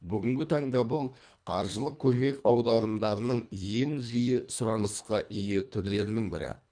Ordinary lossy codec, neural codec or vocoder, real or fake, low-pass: none; codec, 24 kHz, 1.5 kbps, HILCodec; fake; 9.9 kHz